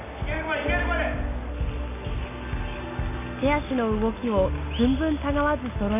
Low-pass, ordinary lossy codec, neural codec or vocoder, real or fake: 3.6 kHz; none; none; real